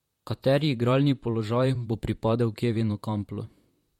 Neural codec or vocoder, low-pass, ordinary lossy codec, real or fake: vocoder, 44.1 kHz, 128 mel bands, Pupu-Vocoder; 19.8 kHz; MP3, 64 kbps; fake